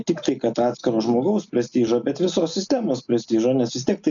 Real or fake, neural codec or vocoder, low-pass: real; none; 7.2 kHz